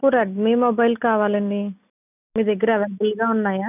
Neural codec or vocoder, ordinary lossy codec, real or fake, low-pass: none; none; real; 3.6 kHz